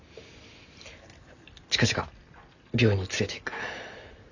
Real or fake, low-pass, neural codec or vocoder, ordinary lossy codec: real; 7.2 kHz; none; none